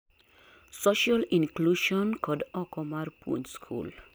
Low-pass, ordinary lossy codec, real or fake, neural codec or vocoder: none; none; real; none